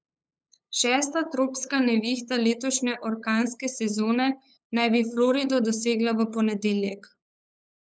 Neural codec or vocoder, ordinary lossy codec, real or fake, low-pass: codec, 16 kHz, 8 kbps, FunCodec, trained on LibriTTS, 25 frames a second; none; fake; none